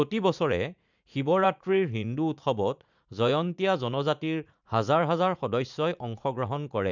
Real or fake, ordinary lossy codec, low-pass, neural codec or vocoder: fake; none; 7.2 kHz; vocoder, 44.1 kHz, 80 mel bands, Vocos